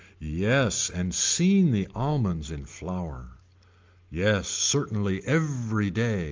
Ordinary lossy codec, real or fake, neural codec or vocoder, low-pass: Opus, 32 kbps; real; none; 7.2 kHz